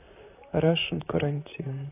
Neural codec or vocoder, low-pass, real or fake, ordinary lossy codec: vocoder, 44.1 kHz, 128 mel bands, Pupu-Vocoder; 3.6 kHz; fake; AAC, 32 kbps